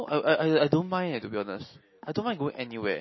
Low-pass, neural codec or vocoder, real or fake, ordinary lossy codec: 7.2 kHz; none; real; MP3, 24 kbps